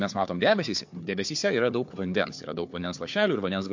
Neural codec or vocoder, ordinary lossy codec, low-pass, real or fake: codec, 16 kHz, 4 kbps, FunCodec, trained on Chinese and English, 50 frames a second; MP3, 48 kbps; 7.2 kHz; fake